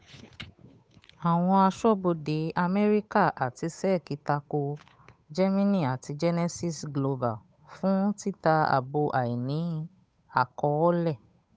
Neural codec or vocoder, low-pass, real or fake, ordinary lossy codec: codec, 16 kHz, 8 kbps, FunCodec, trained on Chinese and English, 25 frames a second; none; fake; none